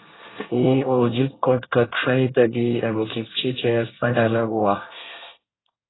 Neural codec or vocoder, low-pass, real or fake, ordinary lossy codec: codec, 24 kHz, 1 kbps, SNAC; 7.2 kHz; fake; AAC, 16 kbps